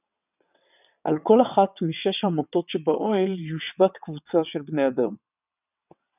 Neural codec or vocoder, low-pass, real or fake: vocoder, 22.05 kHz, 80 mel bands, WaveNeXt; 3.6 kHz; fake